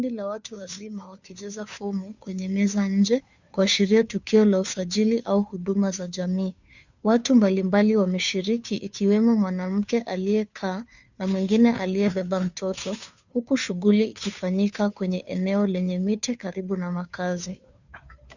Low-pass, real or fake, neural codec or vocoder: 7.2 kHz; fake; codec, 16 kHz, 2 kbps, FunCodec, trained on Chinese and English, 25 frames a second